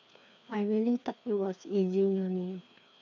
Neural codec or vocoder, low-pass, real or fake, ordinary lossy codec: codec, 16 kHz, 2 kbps, FreqCodec, larger model; 7.2 kHz; fake; none